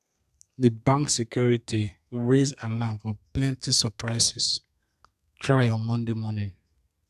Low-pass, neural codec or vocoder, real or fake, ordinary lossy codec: 14.4 kHz; codec, 32 kHz, 1.9 kbps, SNAC; fake; none